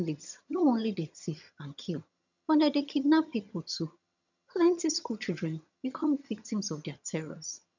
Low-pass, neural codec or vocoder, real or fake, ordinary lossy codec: 7.2 kHz; vocoder, 22.05 kHz, 80 mel bands, HiFi-GAN; fake; none